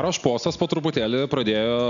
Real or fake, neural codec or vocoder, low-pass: real; none; 7.2 kHz